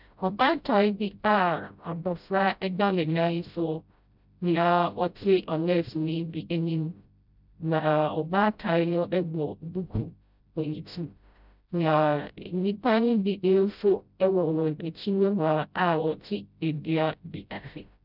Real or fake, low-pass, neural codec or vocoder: fake; 5.4 kHz; codec, 16 kHz, 0.5 kbps, FreqCodec, smaller model